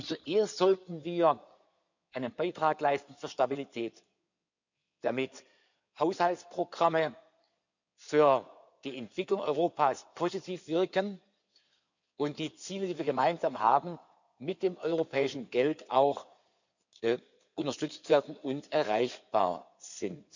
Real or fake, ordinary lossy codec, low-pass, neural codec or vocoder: fake; none; 7.2 kHz; codec, 16 kHz in and 24 kHz out, 2.2 kbps, FireRedTTS-2 codec